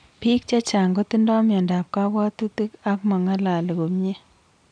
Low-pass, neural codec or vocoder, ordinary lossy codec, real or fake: 9.9 kHz; none; none; real